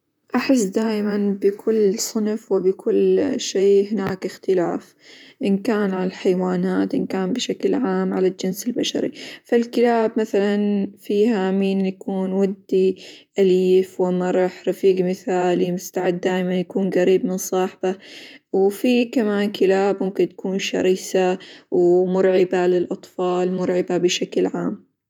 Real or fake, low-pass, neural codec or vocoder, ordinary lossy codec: fake; 19.8 kHz; vocoder, 44.1 kHz, 128 mel bands, Pupu-Vocoder; none